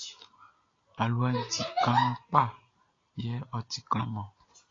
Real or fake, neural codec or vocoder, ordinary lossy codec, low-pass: real; none; AAC, 32 kbps; 7.2 kHz